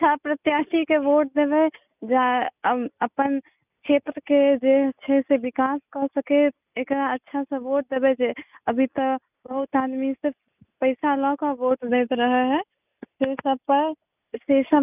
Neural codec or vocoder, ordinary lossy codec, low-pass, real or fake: none; none; 3.6 kHz; real